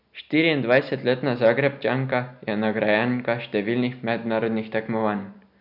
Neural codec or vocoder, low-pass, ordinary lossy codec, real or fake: none; 5.4 kHz; none; real